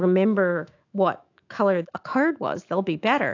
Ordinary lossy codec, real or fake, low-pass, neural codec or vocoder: AAC, 48 kbps; fake; 7.2 kHz; autoencoder, 48 kHz, 128 numbers a frame, DAC-VAE, trained on Japanese speech